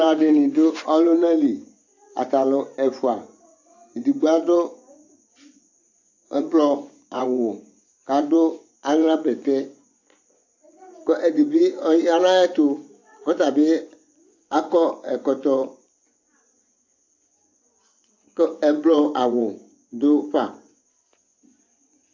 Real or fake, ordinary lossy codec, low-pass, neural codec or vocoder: fake; AAC, 48 kbps; 7.2 kHz; vocoder, 24 kHz, 100 mel bands, Vocos